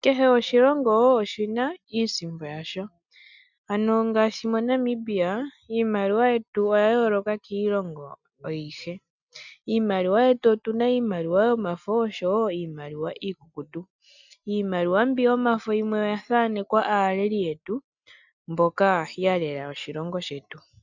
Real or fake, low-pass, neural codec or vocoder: real; 7.2 kHz; none